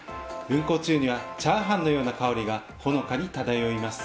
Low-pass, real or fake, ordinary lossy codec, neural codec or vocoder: none; real; none; none